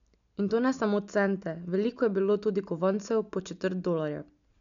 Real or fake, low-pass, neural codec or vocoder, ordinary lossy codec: real; 7.2 kHz; none; none